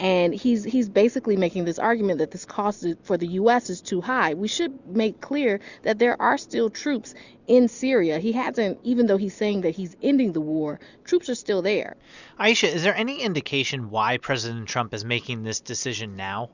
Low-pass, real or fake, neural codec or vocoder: 7.2 kHz; real; none